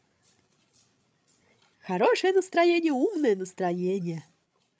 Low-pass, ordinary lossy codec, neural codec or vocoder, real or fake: none; none; codec, 16 kHz, 16 kbps, FreqCodec, larger model; fake